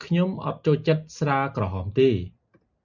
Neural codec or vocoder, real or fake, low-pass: none; real; 7.2 kHz